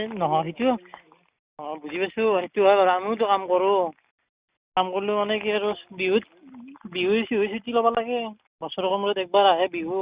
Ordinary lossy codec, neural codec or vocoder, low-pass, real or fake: Opus, 64 kbps; none; 3.6 kHz; real